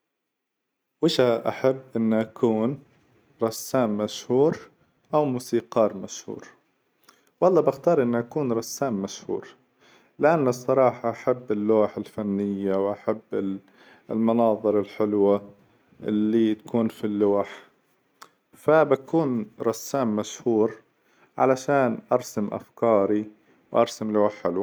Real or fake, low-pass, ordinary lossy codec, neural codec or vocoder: real; none; none; none